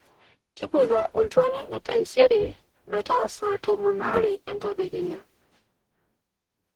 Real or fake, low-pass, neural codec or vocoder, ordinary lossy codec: fake; 19.8 kHz; codec, 44.1 kHz, 0.9 kbps, DAC; Opus, 16 kbps